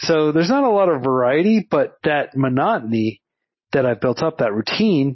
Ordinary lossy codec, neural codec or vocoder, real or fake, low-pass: MP3, 24 kbps; none; real; 7.2 kHz